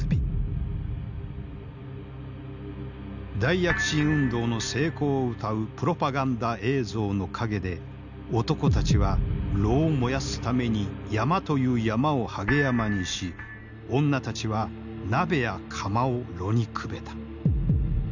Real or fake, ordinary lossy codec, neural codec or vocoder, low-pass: real; none; none; 7.2 kHz